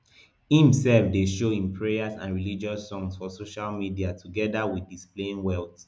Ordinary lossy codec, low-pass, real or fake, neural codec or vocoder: none; none; real; none